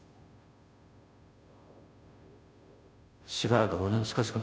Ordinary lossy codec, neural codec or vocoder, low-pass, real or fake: none; codec, 16 kHz, 0.5 kbps, FunCodec, trained on Chinese and English, 25 frames a second; none; fake